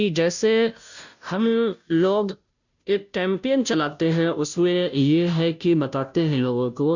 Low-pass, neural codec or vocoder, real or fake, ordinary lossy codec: 7.2 kHz; codec, 16 kHz, 0.5 kbps, FunCodec, trained on Chinese and English, 25 frames a second; fake; none